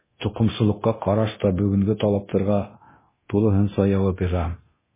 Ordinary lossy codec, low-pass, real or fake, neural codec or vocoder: MP3, 16 kbps; 3.6 kHz; fake; codec, 24 kHz, 0.9 kbps, DualCodec